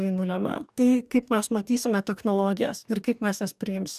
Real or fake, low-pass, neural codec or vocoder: fake; 14.4 kHz; codec, 44.1 kHz, 2.6 kbps, DAC